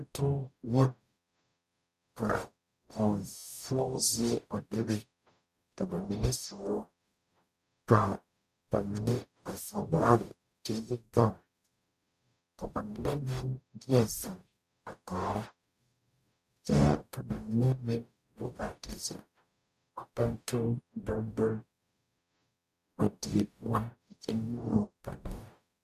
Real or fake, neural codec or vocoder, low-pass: fake; codec, 44.1 kHz, 0.9 kbps, DAC; 14.4 kHz